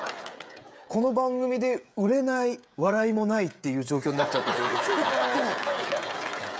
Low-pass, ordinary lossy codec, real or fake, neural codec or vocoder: none; none; fake; codec, 16 kHz, 16 kbps, FreqCodec, smaller model